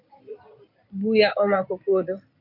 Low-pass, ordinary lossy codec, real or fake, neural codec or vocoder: 5.4 kHz; AAC, 48 kbps; fake; codec, 16 kHz in and 24 kHz out, 2.2 kbps, FireRedTTS-2 codec